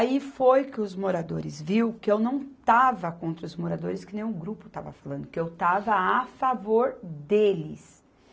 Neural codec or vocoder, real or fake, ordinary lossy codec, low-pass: none; real; none; none